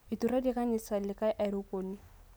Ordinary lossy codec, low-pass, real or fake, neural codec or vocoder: none; none; real; none